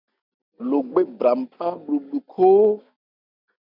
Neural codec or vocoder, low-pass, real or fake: none; 5.4 kHz; real